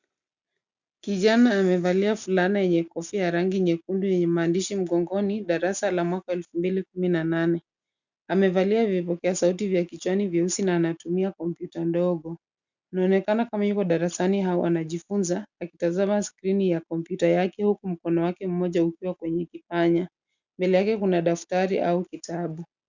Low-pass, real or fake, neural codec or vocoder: 7.2 kHz; real; none